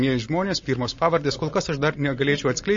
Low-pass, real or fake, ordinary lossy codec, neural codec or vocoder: 7.2 kHz; real; MP3, 32 kbps; none